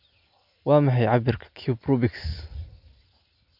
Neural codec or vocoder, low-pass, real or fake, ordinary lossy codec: none; 5.4 kHz; real; none